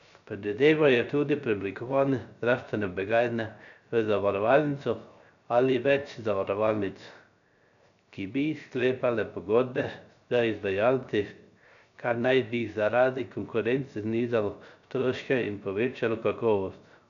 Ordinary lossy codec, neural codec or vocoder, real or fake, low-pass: none; codec, 16 kHz, 0.3 kbps, FocalCodec; fake; 7.2 kHz